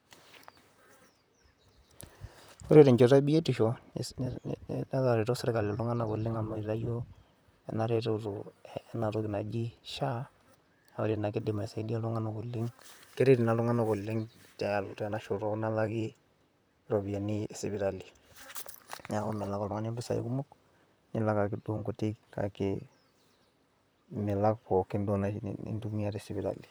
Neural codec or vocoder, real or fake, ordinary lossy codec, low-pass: vocoder, 44.1 kHz, 128 mel bands, Pupu-Vocoder; fake; none; none